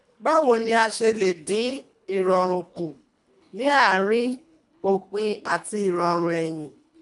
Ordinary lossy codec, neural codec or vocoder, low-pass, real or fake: none; codec, 24 kHz, 1.5 kbps, HILCodec; 10.8 kHz; fake